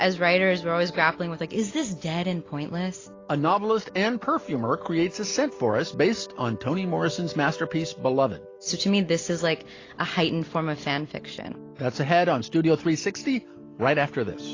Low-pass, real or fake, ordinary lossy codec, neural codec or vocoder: 7.2 kHz; real; AAC, 32 kbps; none